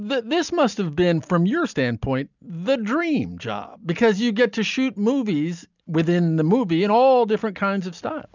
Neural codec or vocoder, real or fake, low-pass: none; real; 7.2 kHz